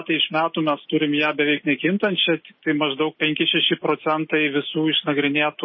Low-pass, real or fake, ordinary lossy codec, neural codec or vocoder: 7.2 kHz; real; MP3, 24 kbps; none